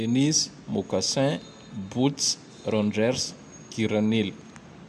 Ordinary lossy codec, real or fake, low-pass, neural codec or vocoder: none; real; 14.4 kHz; none